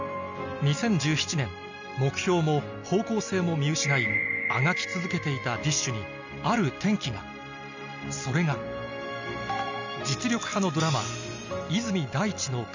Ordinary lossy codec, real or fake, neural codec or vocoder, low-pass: none; real; none; 7.2 kHz